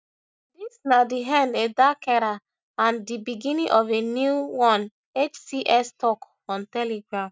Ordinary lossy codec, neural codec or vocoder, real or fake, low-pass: none; none; real; none